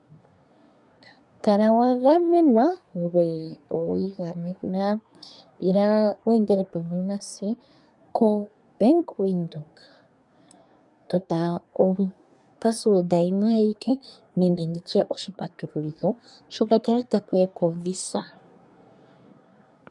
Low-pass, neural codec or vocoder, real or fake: 10.8 kHz; codec, 24 kHz, 1 kbps, SNAC; fake